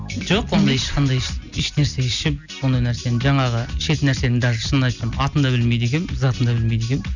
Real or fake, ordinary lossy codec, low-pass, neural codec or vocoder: real; none; 7.2 kHz; none